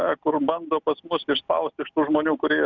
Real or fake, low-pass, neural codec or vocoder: real; 7.2 kHz; none